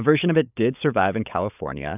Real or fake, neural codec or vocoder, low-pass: fake; codec, 16 kHz, 16 kbps, FunCodec, trained on LibriTTS, 50 frames a second; 3.6 kHz